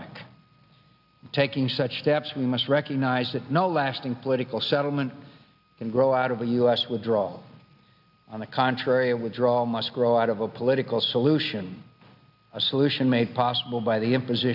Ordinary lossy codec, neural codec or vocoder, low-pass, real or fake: AAC, 48 kbps; none; 5.4 kHz; real